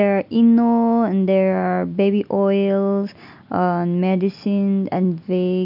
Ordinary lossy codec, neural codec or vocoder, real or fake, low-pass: none; none; real; 5.4 kHz